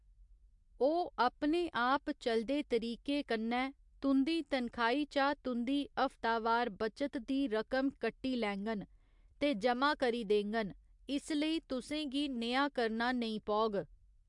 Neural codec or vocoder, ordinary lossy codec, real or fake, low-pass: none; MP3, 64 kbps; real; 10.8 kHz